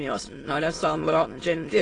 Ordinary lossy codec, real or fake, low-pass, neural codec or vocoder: AAC, 32 kbps; fake; 9.9 kHz; autoencoder, 22.05 kHz, a latent of 192 numbers a frame, VITS, trained on many speakers